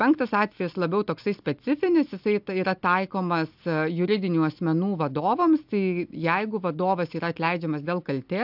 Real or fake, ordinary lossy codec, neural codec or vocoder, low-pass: real; AAC, 48 kbps; none; 5.4 kHz